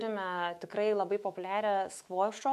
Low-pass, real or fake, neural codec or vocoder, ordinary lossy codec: 14.4 kHz; fake; autoencoder, 48 kHz, 128 numbers a frame, DAC-VAE, trained on Japanese speech; MP3, 64 kbps